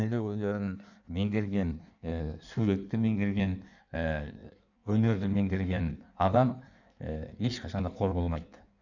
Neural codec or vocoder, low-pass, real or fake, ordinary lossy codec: codec, 16 kHz in and 24 kHz out, 1.1 kbps, FireRedTTS-2 codec; 7.2 kHz; fake; none